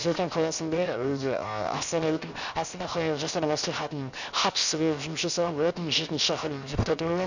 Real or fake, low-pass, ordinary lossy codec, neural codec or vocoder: fake; 7.2 kHz; none; codec, 16 kHz, 0.7 kbps, FocalCodec